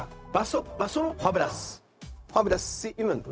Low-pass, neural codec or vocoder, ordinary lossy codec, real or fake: none; codec, 16 kHz, 0.4 kbps, LongCat-Audio-Codec; none; fake